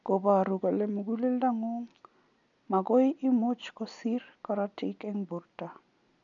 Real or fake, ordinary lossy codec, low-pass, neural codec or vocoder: real; none; 7.2 kHz; none